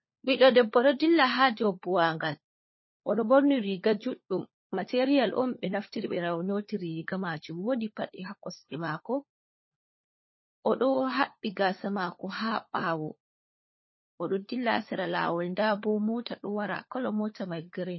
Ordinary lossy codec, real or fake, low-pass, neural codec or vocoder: MP3, 24 kbps; fake; 7.2 kHz; codec, 16 kHz, 4 kbps, FunCodec, trained on LibriTTS, 50 frames a second